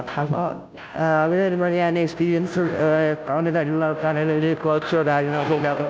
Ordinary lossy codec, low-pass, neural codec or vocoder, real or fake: none; none; codec, 16 kHz, 0.5 kbps, FunCodec, trained on Chinese and English, 25 frames a second; fake